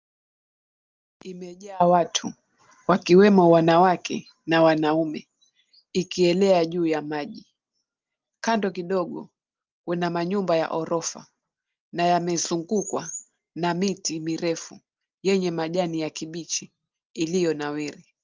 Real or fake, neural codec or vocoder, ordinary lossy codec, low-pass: real; none; Opus, 32 kbps; 7.2 kHz